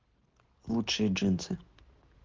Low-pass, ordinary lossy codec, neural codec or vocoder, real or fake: 7.2 kHz; Opus, 16 kbps; none; real